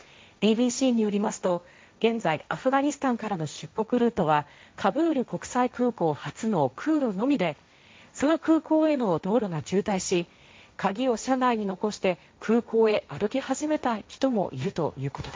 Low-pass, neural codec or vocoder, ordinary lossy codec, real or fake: none; codec, 16 kHz, 1.1 kbps, Voila-Tokenizer; none; fake